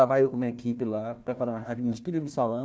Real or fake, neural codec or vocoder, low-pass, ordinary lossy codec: fake; codec, 16 kHz, 1 kbps, FunCodec, trained on Chinese and English, 50 frames a second; none; none